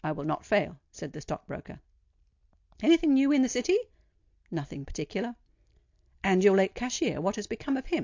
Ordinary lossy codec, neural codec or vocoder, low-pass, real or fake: AAC, 48 kbps; none; 7.2 kHz; real